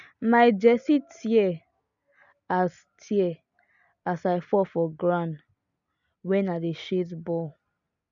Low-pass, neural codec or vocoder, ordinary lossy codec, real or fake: 7.2 kHz; none; none; real